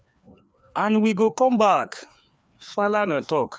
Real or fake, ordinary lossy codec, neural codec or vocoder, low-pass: fake; none; codec, 16 kHz, 2 kbps, FreqCodec, larger model; none